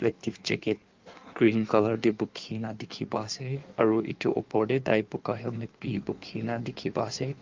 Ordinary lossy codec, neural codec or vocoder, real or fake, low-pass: Opus, 24 kbps; codec, 16 kHz in and 24 kHz out, 1.1 kbps, FireRedTTS-2 codec; fake; 7.2 kHz